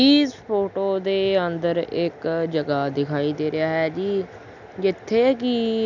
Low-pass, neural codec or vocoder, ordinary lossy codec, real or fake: 7.2 kHz; none; none; real